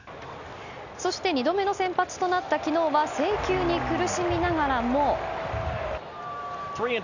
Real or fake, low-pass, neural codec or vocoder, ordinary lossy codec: real; 7.2 kHz; none; none